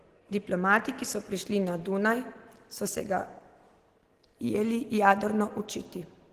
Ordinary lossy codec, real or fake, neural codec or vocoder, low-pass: Opus, 16 kbps; real; none; 14.4 kHz